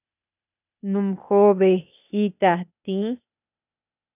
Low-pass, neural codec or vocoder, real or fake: 3.6 kHz; codec, 16 kHz, 0.8 kbps, ZipCodec; fake